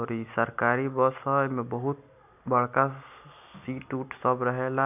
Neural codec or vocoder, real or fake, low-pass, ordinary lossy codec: none; real; 3.6 kHz; none